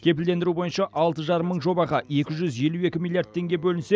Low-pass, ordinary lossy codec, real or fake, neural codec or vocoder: none; none; real; none